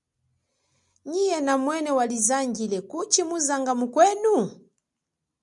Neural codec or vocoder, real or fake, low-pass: none; real; 10.8 kHz